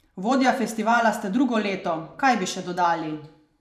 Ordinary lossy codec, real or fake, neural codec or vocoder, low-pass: none; fake; vocoder, 44.1 kHz, 128 mel bands every 512 samples, BigVGAN v2; 14.4 kHz